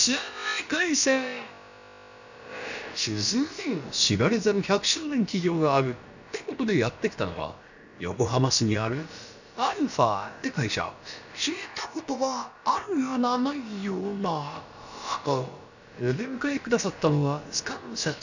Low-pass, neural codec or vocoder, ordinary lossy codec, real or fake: 7.2 kHz; codec, 16 kHz, about 1 kbps, DyCAST, with the encoder's durations; none; fake